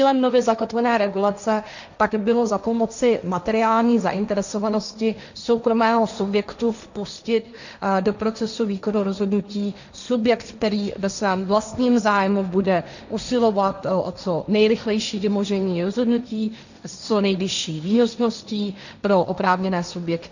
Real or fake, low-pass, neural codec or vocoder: fake; 7.2 kHz; codec, 16 kHz, 1.1 kbps, Voila-Tokenizer